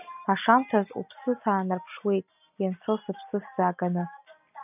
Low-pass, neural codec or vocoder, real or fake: 3.6 kHz; none; real